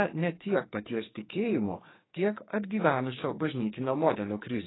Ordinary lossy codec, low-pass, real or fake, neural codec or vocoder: AAC, 16 kbps; 7.2 kHz; fake; codec, 44.1 kHz, 2.6 kbps, SNAC